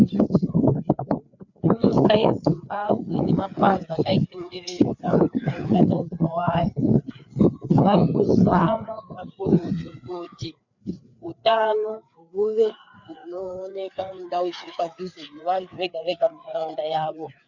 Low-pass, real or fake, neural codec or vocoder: 7.2 kHz; fake; codec, 16 kHz, 4 kbps, FreqCodec, larger model